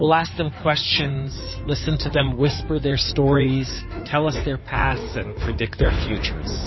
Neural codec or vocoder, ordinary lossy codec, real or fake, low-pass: codec, 16 kHz in and 24 kHz out, 2.2 kbps, FireRedTTS-2 codec; MP3, 24 kbps; fake; 7.2 kHz